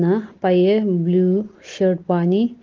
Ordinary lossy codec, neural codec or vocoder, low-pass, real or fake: Opus, 16 kbps; none; 7.2 kHz; real